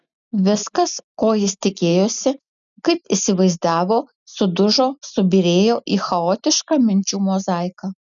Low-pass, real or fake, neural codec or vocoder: 7.2 kHz; real; none